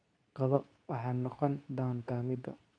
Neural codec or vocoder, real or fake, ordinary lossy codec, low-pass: codec, 24 kHz, 0.9 kbps, WavTokenizer, medium speech release version 2; fake; none; 9.9 kHz